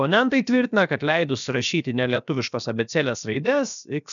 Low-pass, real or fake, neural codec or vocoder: 7.2 kHz; fake; codec, 16 kHz, about 1 kbps, DyCAST, with the encoder's durations